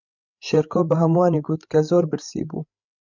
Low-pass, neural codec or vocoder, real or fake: 7.2 kHz; codec, 16 kHz, 8 kbps, FreqCodec, larger model; fake